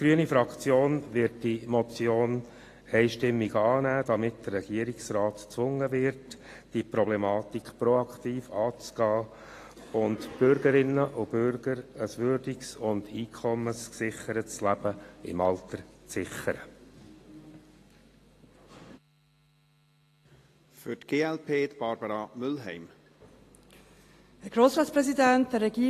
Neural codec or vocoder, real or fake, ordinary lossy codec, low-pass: none; real; AAC, 48 kbps; 14.4 kHz